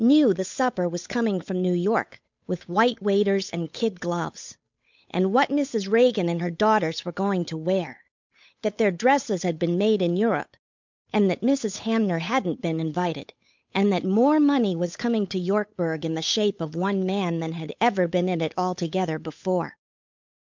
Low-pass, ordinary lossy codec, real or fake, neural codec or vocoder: 7.2 kHz; MP3, 64 kbps; fake; codec, 16 kHz, 8 kbps, FunCodec, trained on Chinese and English, 25 frames a second